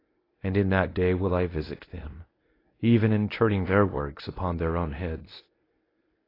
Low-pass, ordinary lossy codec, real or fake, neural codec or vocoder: 5.4 kHz; AAC, 24 kbps; fake; codec, 24 kHz, 0.9 kbps, WavTokenizer, medium speech release version 1